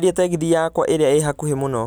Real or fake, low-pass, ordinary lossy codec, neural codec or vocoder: real; none; none; none